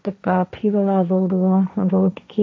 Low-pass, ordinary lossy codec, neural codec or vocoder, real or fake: none; none; codec, 16 kHz, 1.1 kbps, Voila-Tokenizer; fake